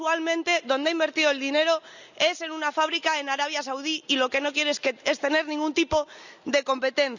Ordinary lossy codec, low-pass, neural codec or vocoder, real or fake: none; 7.2 kHz; none; real